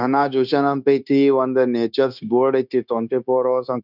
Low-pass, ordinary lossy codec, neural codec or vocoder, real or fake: 5.4 kHz; none; codec, 16 kHz, 0.9 kbps, LongCat-Audio-Codec; fake